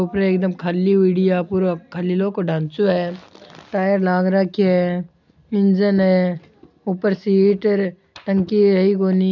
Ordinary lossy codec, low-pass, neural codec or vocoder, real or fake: none; 7.2 kHz; none; real